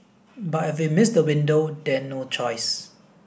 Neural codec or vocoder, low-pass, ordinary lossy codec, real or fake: none; none; none; real